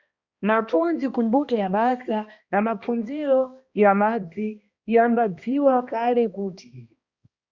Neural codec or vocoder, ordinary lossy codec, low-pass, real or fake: codec, 16 kHz, 1 kbps, X-Codec, HuBERT features, trained on balanced general audio; Opus, 64 kbps; 7.2 kHz; fake